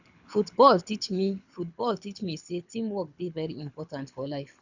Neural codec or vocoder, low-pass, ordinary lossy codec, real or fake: codec, 24 kHz, 6 kbps, HILCodec; 7.2 kHz; none; fake